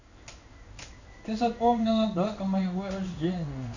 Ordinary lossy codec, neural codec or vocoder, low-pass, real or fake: none; codec, 16 kHz in and 24 kHz out, 1 kbps, XY-Tokenizer; 7.2 kHz; fake